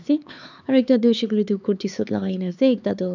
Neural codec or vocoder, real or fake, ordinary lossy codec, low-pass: codec, 16 kHz, 4 kbps, X-Codec, HuBERT features, trained on LibriSpeech; fake; none; 7.2 kHz